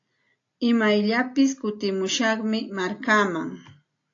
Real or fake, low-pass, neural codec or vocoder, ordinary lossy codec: real; 7.2 kHz; none; AAC, 48 kbps